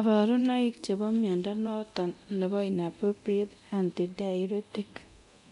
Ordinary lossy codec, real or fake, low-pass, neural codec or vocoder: none; fake; 10.8 kHz; codec, 24 kHz, 0.9 kbps, DualCodec